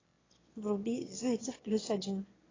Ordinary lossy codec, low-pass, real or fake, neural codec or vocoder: AAC, 32 kbps; 7.2 kHz; fake; autoencoder, 22.05 kHz, a latent of 192 numbers a frame, VITS, trained on one speaker